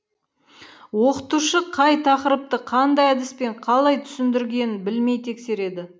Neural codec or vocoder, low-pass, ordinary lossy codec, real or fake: none; none; none; real